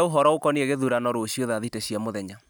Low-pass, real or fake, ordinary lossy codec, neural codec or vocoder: none; real; none; none